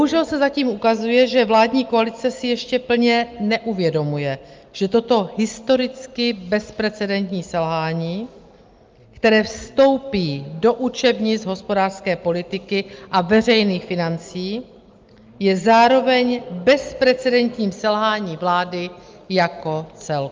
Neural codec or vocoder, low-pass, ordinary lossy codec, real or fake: none; 7.2 kHz; Opus, 32 kbps; real